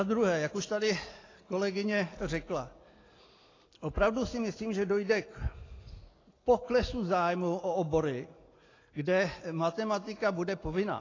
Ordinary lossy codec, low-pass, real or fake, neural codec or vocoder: AAC, 32 kbps; 7.2 kHz; real; none